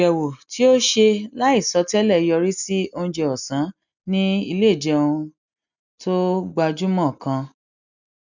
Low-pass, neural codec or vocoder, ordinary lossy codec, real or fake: 7.2 kHz; none; none; real